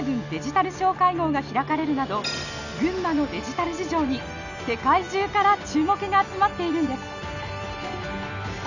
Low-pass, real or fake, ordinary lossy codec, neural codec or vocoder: 7.2 kHz; real; none; none